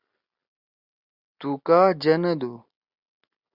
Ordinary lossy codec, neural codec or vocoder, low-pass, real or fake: Opus, 64 kbps; none; 5.4 kHz; real